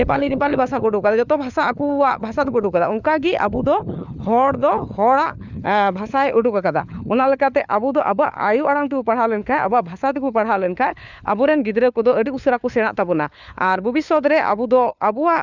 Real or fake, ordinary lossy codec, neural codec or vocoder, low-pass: fake; none; codec, 16 kHz, 4 kbps, FunCodec, trained on LibriTTS, 50 frames a second; 7.2 kHz